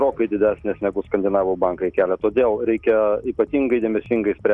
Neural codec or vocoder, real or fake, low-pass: none; real; 10.8 kHz